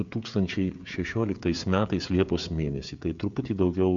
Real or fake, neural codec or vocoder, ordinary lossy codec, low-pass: fake; codec, 16 kHz, 16 kbps, FreqCodec, smaller model; MP3, 64 kbps; 7.2 kHz